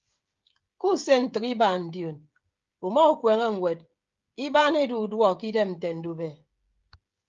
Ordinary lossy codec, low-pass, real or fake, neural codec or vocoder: Opus, 32 kbps; 7.2 kHz; fake; codec, 16 kHz, 16 kbps, FreqCodec, smaller model